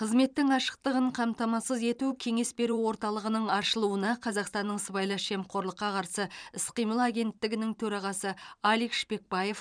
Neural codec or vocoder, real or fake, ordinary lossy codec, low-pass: vocoder, 44.1 kHz, 128 mel bands every 512 samples, BigVGAN v2; fake; none; 9.9 kHz